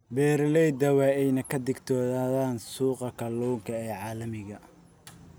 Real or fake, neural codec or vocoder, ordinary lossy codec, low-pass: real; none; none; none